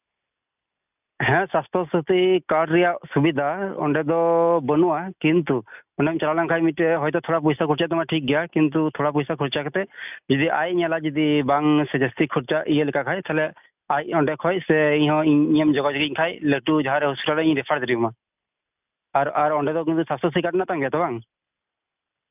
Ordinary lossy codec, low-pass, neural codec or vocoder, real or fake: none; 3.6 kHz; none; real